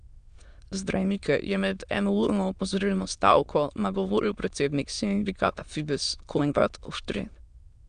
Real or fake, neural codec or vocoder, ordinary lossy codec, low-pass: fake; autoencoder, 22.05 kHz, a latent of 192 numbers a frame, VITS, trained on many speakers; Opus, 64 kbps; 9.9 kHz